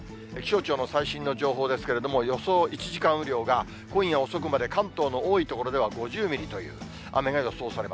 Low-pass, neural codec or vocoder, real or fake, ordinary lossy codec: none; none; real; none